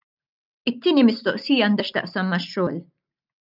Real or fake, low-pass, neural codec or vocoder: real; 5.4 kHz; none